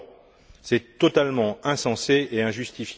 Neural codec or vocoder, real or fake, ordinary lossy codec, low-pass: none; real; none; none